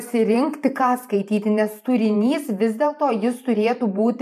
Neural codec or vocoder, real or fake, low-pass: vocoder, 44.1 kHz, 128 mel bands every 512 samples, BigVGAN v2; fake; 14.4 kHz